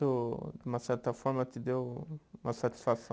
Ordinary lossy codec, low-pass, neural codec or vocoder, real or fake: none; none; none; real